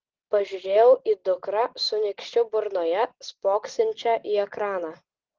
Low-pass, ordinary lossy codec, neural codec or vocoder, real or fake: 7.2 kHz; Opus, 16 kbps; none; real